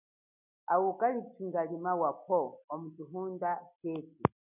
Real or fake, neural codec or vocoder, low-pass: real; none; 3.6 kHz